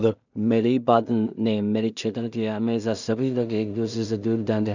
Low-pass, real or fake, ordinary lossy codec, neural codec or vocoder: 7.2 kHz; fake; none; codec, 16 kHz in and 24 kHz out, 0.4 kbps, LongCat-Audio-Codec, two codebook decoder